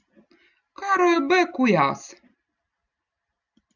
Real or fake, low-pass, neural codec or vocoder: real; 7.2 kHz; none